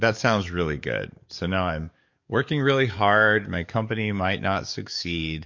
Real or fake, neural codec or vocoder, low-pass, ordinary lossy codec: fake; codec, 44.1 kHz, 7.8 kbps, DAC; 7.2 kHz; MP3, 48 kbps